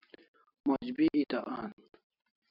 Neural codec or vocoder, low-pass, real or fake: none; 5.4 kHz; real